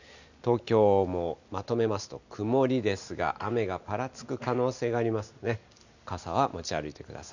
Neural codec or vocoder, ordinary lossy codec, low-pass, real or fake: none; none; 7.2 kHz; real